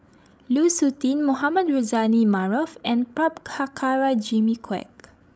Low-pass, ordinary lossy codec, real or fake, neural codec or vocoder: none; none; fake; codec, 16 kHz, 16 kbps, FreqCodec, larger model